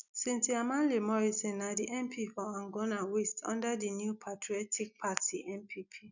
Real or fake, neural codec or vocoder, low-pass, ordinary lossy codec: real; none; 7.2 kHz; none